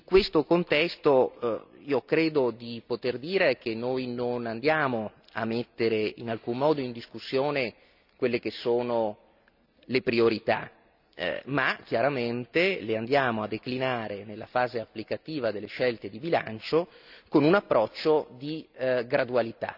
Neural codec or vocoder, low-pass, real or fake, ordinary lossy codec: none; 5.4 kHz; real; none